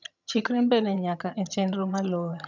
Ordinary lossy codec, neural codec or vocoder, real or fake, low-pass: none; vocoder, 22.05 kHz, 80 mel bands, HiFi-GAN; fake; 7.2 kHz